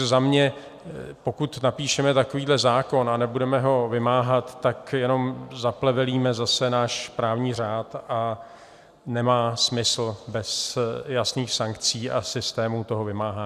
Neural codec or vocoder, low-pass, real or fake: none; 14.4 kHz; real